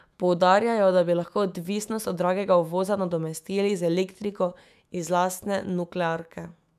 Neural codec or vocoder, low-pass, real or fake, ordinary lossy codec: autoencoder, 48 kHz, 128 numbers a frame, DAC-VAE, trained on Japanese speech; 14.4 kHz; fake; none